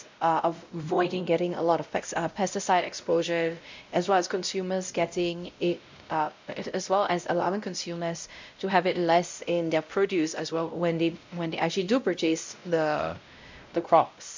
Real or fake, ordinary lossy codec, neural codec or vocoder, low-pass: fake; MP3, 64 kbps; codec, 16 kHz, 0.5 kbps, X-Codec, WavLM features, trained on Multilingual LibriSpeech; 7.2 kHz